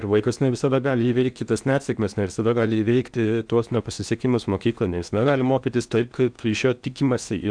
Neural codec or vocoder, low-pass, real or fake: codec, 16 kHz in and 24 kHz out, 0.8 kbps, FocalCodec, streaming, 65536 codes; 9.9 kHz; fake